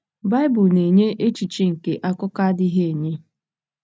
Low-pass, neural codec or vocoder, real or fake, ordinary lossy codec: none; none; real; none